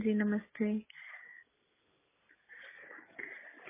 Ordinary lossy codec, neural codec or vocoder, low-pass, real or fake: MP3, 16 kbps; none; 3.6 kHz; real